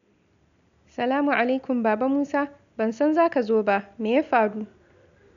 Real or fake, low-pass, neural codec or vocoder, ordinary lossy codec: real; 7.2 kHz; none; none